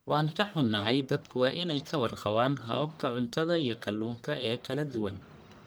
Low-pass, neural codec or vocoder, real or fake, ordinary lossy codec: none; codec, 44.1 kHz, 1.7 kbps, Pupu-Codec; fake; none